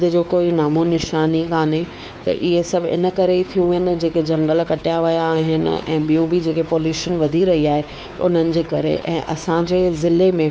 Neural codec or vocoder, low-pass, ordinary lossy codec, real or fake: codec, 16 kHz, 4 kbps, X-Codec, WavLM features, trained on Multilingual LibriSpeech; none; none; fake